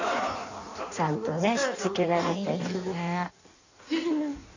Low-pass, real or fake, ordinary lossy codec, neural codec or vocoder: 7.2 kHz; fake; none; codec, 16 kHz in and 24 kHz out, 1.1 kbps, FireRedTTS-2 codec